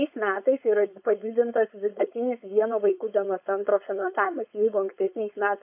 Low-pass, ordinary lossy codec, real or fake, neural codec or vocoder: 3.6 kHz; AAC, 32 kbps; fake; codec, 16 kHz, 4.8 kbps, FACodec